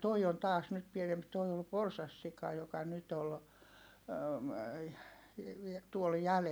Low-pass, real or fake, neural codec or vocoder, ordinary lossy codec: none; real; none; none